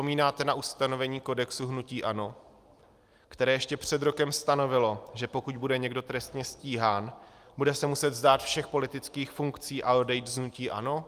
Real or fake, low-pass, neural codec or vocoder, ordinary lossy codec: real; 14.4 kHz; none; Opus, 32 kbps